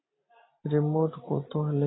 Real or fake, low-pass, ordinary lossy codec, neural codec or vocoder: fake; 7.2 kHz; AAC, 16 kbps; vocoder, 44.1 kHz, 128 mel bands every 256 samples, BigVGAN v2